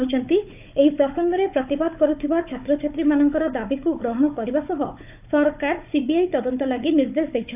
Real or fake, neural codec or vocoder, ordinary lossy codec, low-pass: fake; codec, 16 kHz, 4 kbps, FunCodec, trained on Chinese and English, 50 frames a second; AAC, 32 kbps; 3.6 kHz